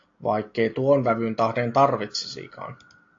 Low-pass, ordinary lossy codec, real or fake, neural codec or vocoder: 7.2 kHz; AAC, 48 kbps; real; none